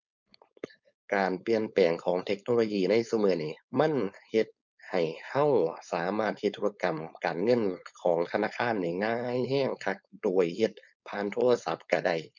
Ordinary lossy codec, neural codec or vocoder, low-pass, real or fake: none; codec, 16 kHz, 4.8 kbps, FACodec; 7.2 kHz; fake